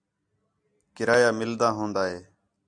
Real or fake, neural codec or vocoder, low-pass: real; none; 9.9 kHz